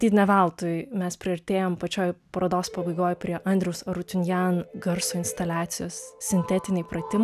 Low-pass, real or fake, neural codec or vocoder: 14.4 kHz; real; none